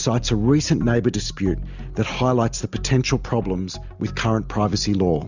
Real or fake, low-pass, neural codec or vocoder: real; 7.2 kHz; none